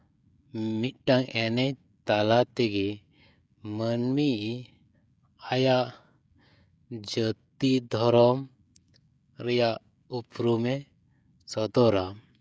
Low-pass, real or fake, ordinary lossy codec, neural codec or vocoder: none; fake; none; codec, 16 kHz, 16 kbps, FreqCodec, smaller model